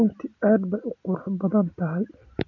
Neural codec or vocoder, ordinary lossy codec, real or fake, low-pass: none; MP3, 48 kbps; real; 7.2 kHz